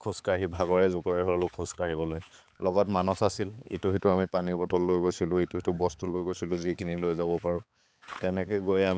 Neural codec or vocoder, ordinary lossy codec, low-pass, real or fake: codec, 16 kHz, 4 kbps, X-Codec, HuBERT features, trained on balanced general audio; none; none; fake